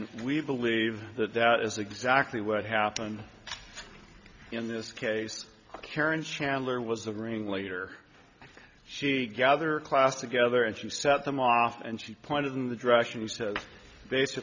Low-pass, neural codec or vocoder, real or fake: 7.2 kHz; none; real